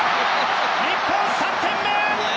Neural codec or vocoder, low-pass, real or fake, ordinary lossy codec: none; none; real; none